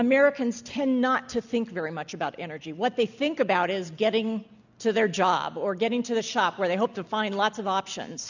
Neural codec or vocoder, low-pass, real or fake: none; 7.2 kHz; real